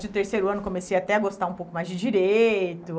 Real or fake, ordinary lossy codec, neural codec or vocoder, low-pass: real; none; none; none